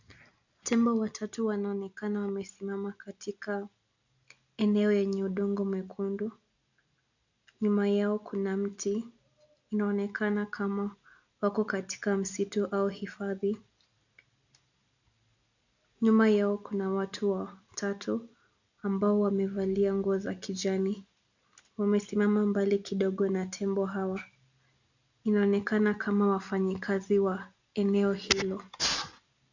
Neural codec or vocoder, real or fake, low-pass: none; real; 7.2 kHz